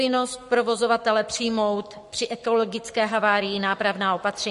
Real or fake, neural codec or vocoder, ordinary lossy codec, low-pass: fake; codec, 44.1 kHz, 7.8 kbps, Pupu-Codec; MP3, 48 kbps; 14.4 kHz